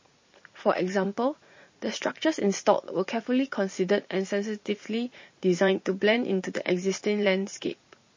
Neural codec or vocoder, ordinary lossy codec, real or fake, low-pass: vocoder, 44.1 kHz, 80 mel bands, Vocos; MP3, 32 kbps; fake; 7.2 kHz